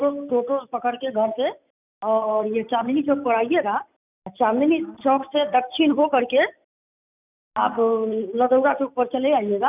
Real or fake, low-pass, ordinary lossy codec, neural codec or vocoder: real; 3.6 kHz; none; none